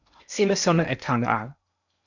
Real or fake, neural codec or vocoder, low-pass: fake; codec, 16 kHz in and 24 kHz out, 0.8 kbps, FocalCodec, streaming, 65536 codes; 7.2 kHz